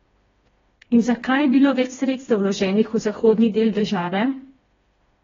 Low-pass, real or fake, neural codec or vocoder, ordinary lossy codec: 7.2 kHz; fake; codec, 16 kHz, 2 kbps, FreqCodec, smaller model; AAC, 24 kbps